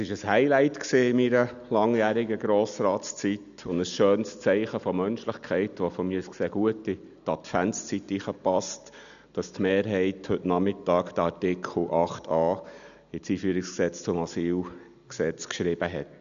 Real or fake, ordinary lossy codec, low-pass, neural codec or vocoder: real; none; 7.2 kHz; none